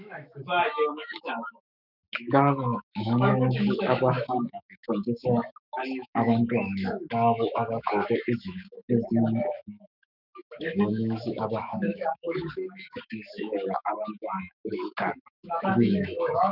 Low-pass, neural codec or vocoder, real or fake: 5.4 kHz; autoencoder, 48 kHz, 128 numbers a frame, DAC-VAE, trained on Japanese speech; fake